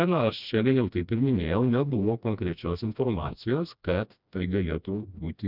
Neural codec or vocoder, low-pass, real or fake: codec, 16 kHz, 1 kbps, FreqCodec, smaller model; 5.4 kHz; fake